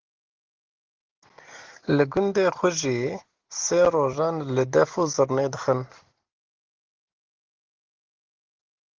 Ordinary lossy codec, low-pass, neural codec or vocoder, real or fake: Opus, 16 kbps; 7.2 kHz; none; real